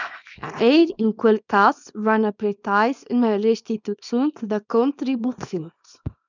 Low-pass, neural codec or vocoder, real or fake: 7.2 kHz; codec, 24 kHz, 0.9 kbps, WavTokenizer, small release; fake